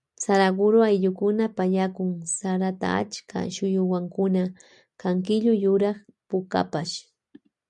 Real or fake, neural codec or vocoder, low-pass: real; none; 10.8 kHz